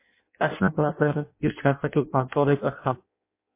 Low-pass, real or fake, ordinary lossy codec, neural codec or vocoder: 3.6 kHz; fake; MP3, 24 kbps; codec, 16 kHz in and 24 kHz out, 0.6 kbps, FireRedTTS-2 codec